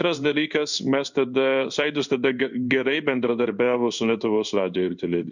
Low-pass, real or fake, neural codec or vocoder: 7.2 kHz; fake; codec, 16 kHz in and 24 kHz out, 1 kbps, XY-Tokenizer